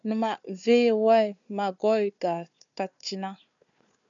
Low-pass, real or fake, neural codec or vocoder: 7.2 kHz; fake; codec, 16 kHz, 4 kbps, FunCodec, trained on Chinese and English, 50 frames a second